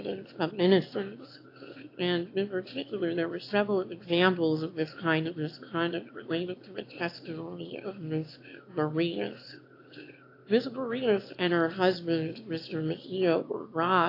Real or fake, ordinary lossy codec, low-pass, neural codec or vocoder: fake; AAC, 32 kbps; 5.4 kHz; autoencoder, 22.05 kHz, a latent of 192 numbers a frame, VITS, trained on one speaker